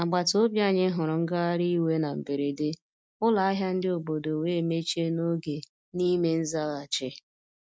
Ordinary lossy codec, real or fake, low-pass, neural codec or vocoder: none; real; none; none